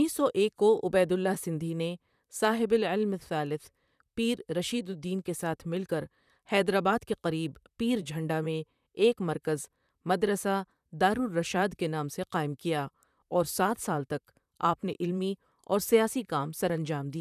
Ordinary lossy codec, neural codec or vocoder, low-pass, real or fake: none; vocoder, 44.1 kHz, 128 mel bands, Pupu-Vocoder; 14.4 kHz; fake